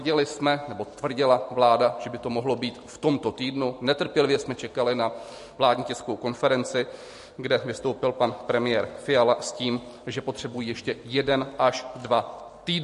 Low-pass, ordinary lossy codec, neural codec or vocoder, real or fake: 14.4 kHz; MP3, 48 kbps; none; real